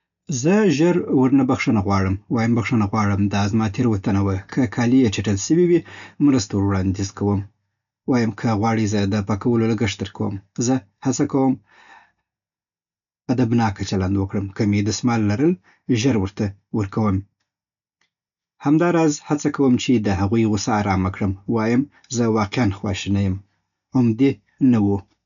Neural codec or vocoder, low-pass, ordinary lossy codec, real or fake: none; 7.2 kHz; none; real